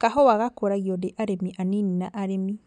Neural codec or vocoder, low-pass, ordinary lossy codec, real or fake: none; 9.9 kHz; none; real